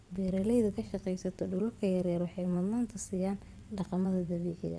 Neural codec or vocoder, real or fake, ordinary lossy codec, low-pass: vocoder, 22.05 kHz, 80 mel bands, WaveNeXt; fake; none; none